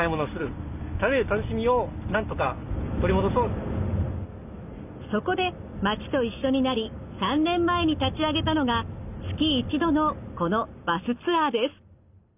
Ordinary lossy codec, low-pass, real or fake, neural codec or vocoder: none; 3.6 kHz; real; none